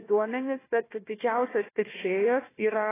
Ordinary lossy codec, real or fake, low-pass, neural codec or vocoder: AAC, 16 kbps; fake; 3.6 kHz; codec, 16 kHz, 1 kbps, FunCodec, trained on Chinese and English, 50 frames a second